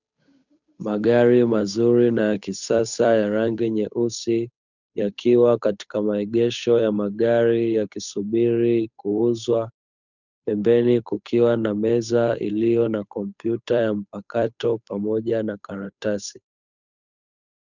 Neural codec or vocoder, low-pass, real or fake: codec, 16 kHz, 8 kbps, FunCodec, trained on Chinese and English, 25 frames a second; 7.2 kHz; fake